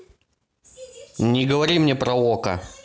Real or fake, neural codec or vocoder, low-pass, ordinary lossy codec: real; none; none; none